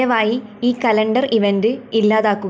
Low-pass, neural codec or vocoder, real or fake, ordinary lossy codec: none; none; real; none